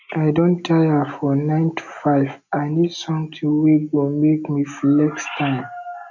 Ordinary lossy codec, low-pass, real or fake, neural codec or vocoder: none; 7.2 kHz; real; none